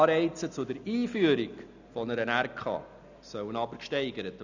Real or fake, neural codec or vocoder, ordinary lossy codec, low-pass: real; none; none; 7.2 kHz